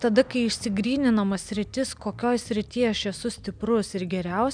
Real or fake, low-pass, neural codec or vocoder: real; 9.9 kHz; none